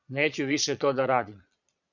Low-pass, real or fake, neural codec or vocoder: 7.2 kHz; fake; vocoder, 22.05 kHz, 80 mel bands, Vocos